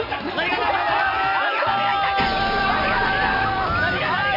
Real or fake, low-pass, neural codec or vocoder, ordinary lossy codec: real; 5.4 kHz; none; MP3, 48 kbps